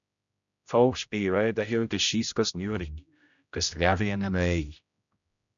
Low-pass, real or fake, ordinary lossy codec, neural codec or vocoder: 7.2 kHz; fake; MP3, 96 kbps; codec, 16 kHz, 0.5 kbps, X-Codec, HuBERT features, trained on general audio